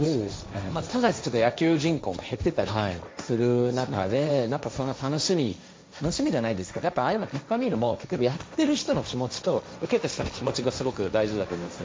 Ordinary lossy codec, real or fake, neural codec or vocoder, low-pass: none; fake; codec, 16 kHz, 1.1 kbps, Voila-Tokenizer; none